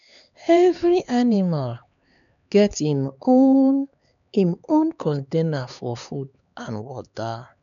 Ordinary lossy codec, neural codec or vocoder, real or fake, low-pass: none; codec, 16 kHz, 4 kbps, X-Codec, HuBERT features, trained on LibriSpeech; fake; 7.2 kHz